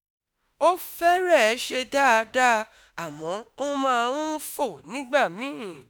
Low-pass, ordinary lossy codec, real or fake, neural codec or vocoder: none; none; fake; autoencoder, 48 kHz, 32 numbers a frame, DAC-VAE, trained on Japanese speech